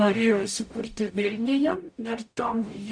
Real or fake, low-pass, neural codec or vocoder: fake; 9.9 kHz; codec, 44.1 kHz, 0.9 kbps, DAC